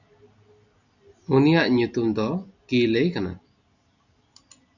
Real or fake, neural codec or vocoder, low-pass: real; none; 7.2 kHz